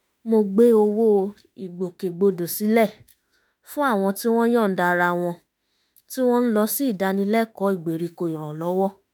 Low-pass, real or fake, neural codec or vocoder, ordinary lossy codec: none; fake; autoencoder, 48 kHz, 32 numbers a frame, DAC-VAE, trained on Japanese speech; none